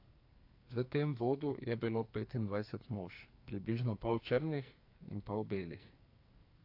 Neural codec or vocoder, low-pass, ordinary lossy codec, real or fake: codec, 44.1 kHz, 2.6 kbps, SNAC; 5.4 kHz; AAC, 32 kbps; fake